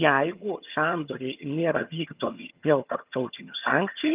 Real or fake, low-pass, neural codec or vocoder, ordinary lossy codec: fake; 3.6 kHz; vocoder, 22.05 kHz, 80 mel bands, HiFi-GAN; Opus, 24 kbps